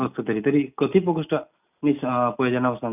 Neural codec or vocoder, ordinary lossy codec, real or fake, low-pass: none; none; real; 3.6 kHz